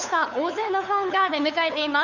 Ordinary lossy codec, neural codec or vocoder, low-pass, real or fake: none; codec, 16 kHz, 8 kbps, FunCodec, trained on LibriTTS, 25 frames a second; 7.2 kHz; fake